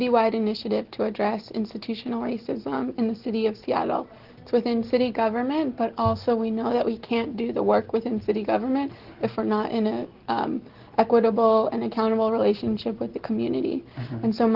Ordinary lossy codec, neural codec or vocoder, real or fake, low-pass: Opus, 16 kbps; none; real; 5.4 kHz